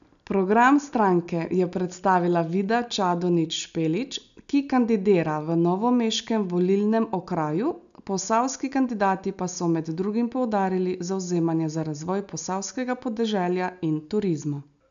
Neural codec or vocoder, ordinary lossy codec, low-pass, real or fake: none; none; 7.2 kHz; real